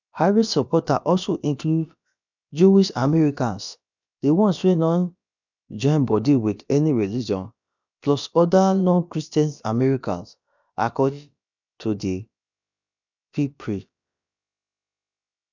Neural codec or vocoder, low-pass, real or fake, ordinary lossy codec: codec, 16 kHz, about 1 kbps, DyCAST, with the encoder's durations; 7.2 kHz; fake; none